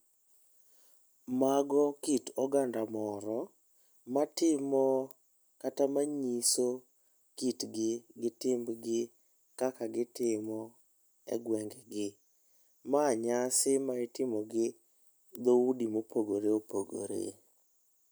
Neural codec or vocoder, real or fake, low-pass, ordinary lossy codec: none; real; none; none